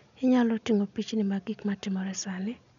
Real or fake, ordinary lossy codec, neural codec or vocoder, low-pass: real; none; none; 7.2 kHz